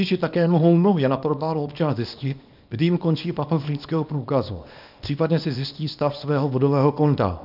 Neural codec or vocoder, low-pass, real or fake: codec, 24 kHz, 0.9 kbps, WavTokenizer, small release; 5.4 kHz; fake